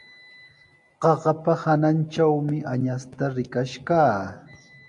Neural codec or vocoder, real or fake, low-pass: none; real; 10.8 kHz